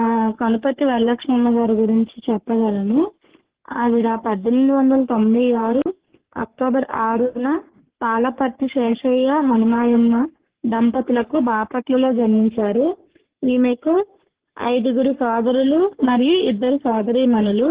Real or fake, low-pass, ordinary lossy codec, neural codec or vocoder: fake; 3.6 kHz; Opus, 16 kbps; codec, 44.1 kHz, 3.4 kbps, Pupu-Codec